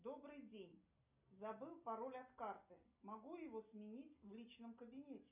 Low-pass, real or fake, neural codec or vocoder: 3.6 kHz; real; none